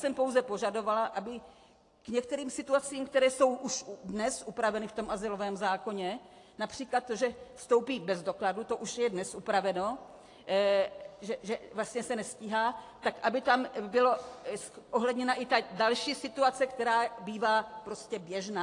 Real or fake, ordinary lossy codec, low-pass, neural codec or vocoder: real; AAC, 48 kbps; 10.8 kHz; none